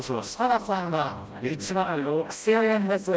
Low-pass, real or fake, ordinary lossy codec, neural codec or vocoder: none; fake; none; codec, 16 kHz, 0.5 kbps, FreqCodec, smaller model